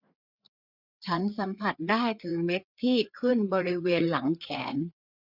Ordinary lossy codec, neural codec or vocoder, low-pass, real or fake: none; codec, 16 kHz, 4 kbps, FreqCodec, larger model; 5.4 kHz; fake